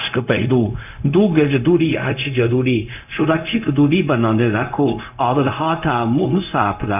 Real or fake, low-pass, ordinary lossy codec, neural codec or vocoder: fake; 3.6 kHz; none; codec, 16 kHz, 0.4 kbps, LongCat-Audio-Codec